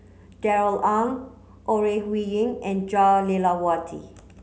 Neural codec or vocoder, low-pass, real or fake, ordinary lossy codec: none; none; real; none